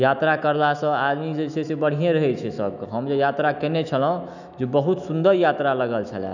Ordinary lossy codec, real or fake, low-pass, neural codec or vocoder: none; fake; 7.2 kHz; autoencoder, 48 kHz, 128 numbers a frame, DAC-VAE, trained on Japanese speech